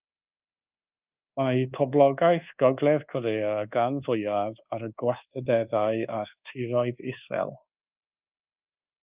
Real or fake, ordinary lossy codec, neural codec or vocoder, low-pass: fake; Opus, 24 kbps; codec, 24 kHz, 1.2 kbps, DualCodec; 3.6 kHz